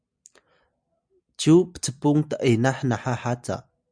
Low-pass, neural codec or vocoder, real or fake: 9.9 kHz; none; real